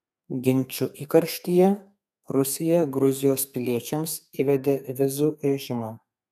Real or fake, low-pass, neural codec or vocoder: fake; 14.4 kHz; codec, 32 kHz, 1.9 kbps, SNAC